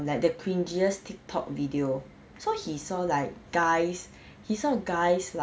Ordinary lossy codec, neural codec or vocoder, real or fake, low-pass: none; none; real; none